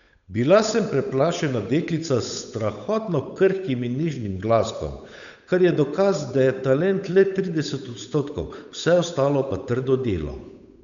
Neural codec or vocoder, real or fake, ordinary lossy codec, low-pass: codec, 16 kHz, 8 kbps, FunCodec, trained on Chinese and English, 25 frames a second; fake; none; 7.2 kHz